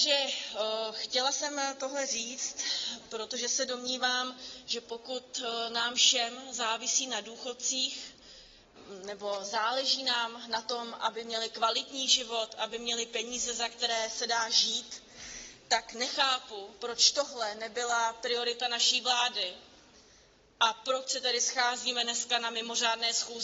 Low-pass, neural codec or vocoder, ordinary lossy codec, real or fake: 7.2 kHz; none; AAC, 24 kbps; real